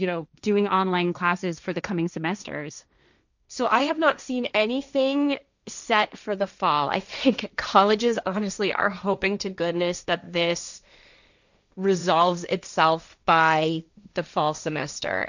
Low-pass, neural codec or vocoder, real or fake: 7.2 kHz; codec, 16 kHz, 1.1 kbps, Voila-Tokenizer; fake